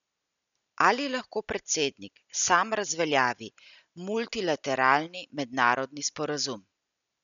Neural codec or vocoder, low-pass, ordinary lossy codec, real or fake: none; 7.2 kHz; none; real